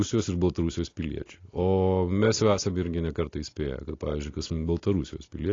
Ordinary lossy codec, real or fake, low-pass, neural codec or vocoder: AAC, 32 kbps; real; 7.2 kHz; none